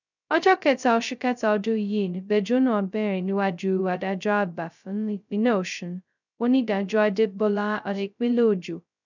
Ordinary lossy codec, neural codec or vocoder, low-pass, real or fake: none; codec, 16 kHz, 0.2 kbps, FocalCodec; 7.2 kHz; fake